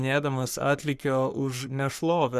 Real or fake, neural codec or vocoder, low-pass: fake; codec, 44.1 kHz, 3.4 kbps, Pupu-Codec; 14.4 kHz